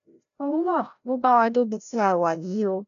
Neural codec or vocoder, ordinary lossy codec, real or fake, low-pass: codec, 16 kHz, 0.5 kbps, FreqCodec, larger model; MP3, 96 kbps; fake; 7.2 kHz